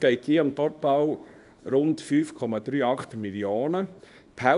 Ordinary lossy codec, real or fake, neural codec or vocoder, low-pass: none; fake; codec, 24 kHz, 0.9 kbps, WavTokenizer, small release; 10.8 kHz